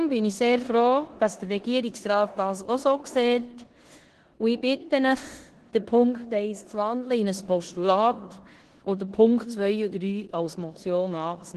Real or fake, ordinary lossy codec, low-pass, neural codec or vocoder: fake; Opus, 16 kbps; 10.8 kHz; codec, 16 kHz in and 24 kHz out, 0.9 kbps, LongCat-Audio-Codec, four codebook decoder